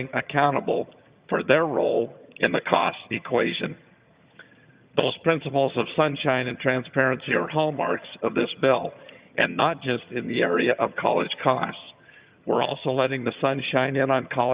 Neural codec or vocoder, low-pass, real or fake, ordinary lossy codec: vocoder, 22.05 kHz, 80 mel bands, HiFi-GAN; 3.6 kHz; fake; Opus, 64 kbps